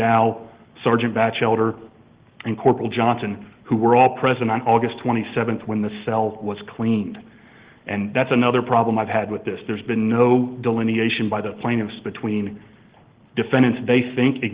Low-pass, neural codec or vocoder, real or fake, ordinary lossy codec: 3.6 kHz; none; real; Opus, 16 kbps